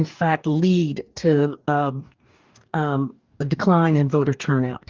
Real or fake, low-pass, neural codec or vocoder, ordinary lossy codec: fake; 7.2 kHz; codec, 44.1 kHz, 2.6 kbps, DAC; Opus, 24 kbps